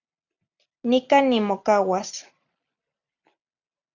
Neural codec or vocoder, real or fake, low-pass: none; real; 7.2 kHz